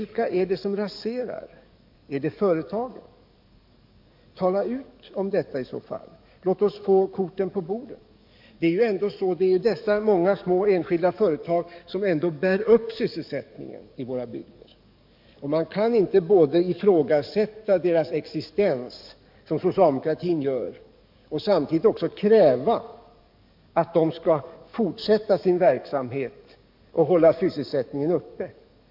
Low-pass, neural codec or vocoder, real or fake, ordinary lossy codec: 5.4 kHz; codec, 44.1 kHz, 7.8 kbps, DAC; fake; MP3, 48 kbps